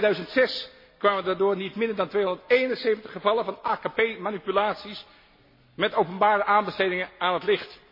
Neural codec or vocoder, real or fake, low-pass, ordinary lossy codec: none; real; 5.4 kHz; MP3, 24 kbps